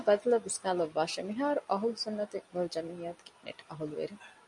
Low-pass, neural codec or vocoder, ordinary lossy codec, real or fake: 10.8 kHz; none; MP3, 64 kbps; real